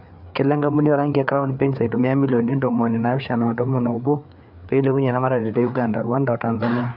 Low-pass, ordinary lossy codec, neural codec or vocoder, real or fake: 5.4 kHz; none; codec, 16 kHz, 4 kbps, FreqCodec, larger model; fake